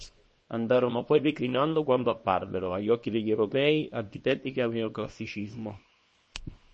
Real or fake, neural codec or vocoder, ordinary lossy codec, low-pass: fake; codec, 24 kHz, 0.9 kbps, WavTokenizer, small release; MP3, 32 kbps; 10.8 kHz